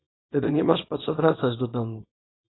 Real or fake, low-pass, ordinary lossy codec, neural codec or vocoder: fake; 7.2 kHz; AAC, 16 kbps; codec, 24 kHz, 0.9 kbps, WavTokenizer, small release